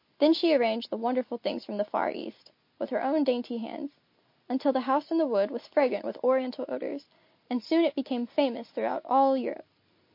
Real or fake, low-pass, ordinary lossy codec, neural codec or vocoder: real; 5.4 kHz; MP3, 32 kbps; none